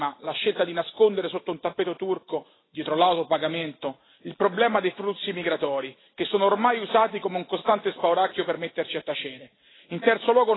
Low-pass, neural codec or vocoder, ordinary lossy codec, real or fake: 7.2 kHz; none; AAC, 16 kbps; real